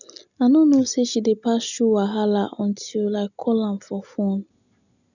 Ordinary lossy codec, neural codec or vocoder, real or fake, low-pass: none; none; real; 7.2 kHz